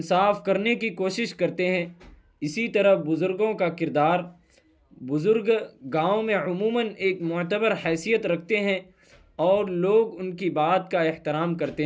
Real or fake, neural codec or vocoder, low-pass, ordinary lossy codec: real; none; none; none